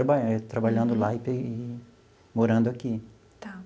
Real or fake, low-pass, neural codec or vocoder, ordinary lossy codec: real; none; none; none